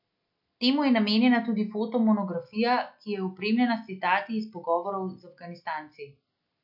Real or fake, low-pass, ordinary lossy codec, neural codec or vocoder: real; 5.4 kHz; MP3, 48 kbps; none